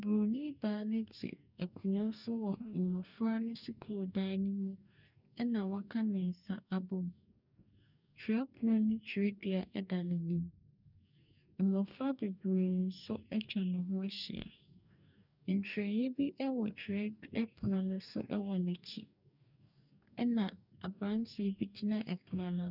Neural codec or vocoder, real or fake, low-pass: codec, 44.1 kHz, 2.6 kbps, DAC; fake; 5.4 kHz